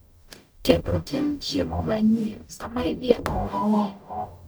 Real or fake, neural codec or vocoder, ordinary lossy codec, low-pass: fake; codec, 44.1 kHz, 0.9 kbps, DAC; none; none